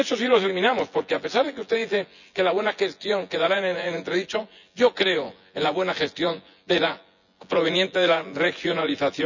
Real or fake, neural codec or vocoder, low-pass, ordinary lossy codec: fake; vocoder, 24 kHz, 100 mel bands, Vocos; 7.2 kHz; none